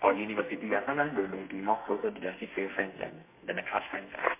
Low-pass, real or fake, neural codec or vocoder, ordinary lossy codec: 3.6 kHz; fake; codec, 32 kHz, 1.9 kbps, SNAC; MP3, 24 kbps